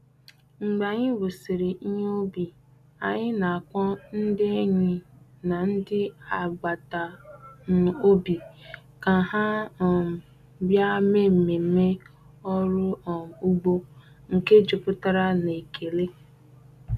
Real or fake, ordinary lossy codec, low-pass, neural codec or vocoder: real; none; 14.4 kHz; none